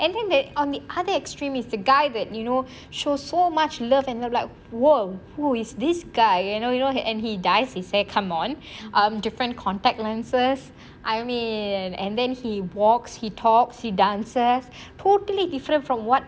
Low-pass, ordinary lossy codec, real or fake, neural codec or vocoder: none; none; real; none